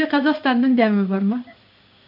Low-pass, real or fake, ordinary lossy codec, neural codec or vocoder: 5.4 kHz; fake; none; codec, 16 kHz in and 24 kHz out, 1 kbps, XY-Tokenizer